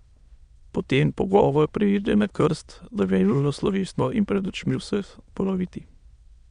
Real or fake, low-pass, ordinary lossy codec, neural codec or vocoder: fake; 9.9 kHz; Opus, 64 kbps; autoencoder, 22.05 kHz, a latent of 192 numbers a frame, VITS, trained on many speakers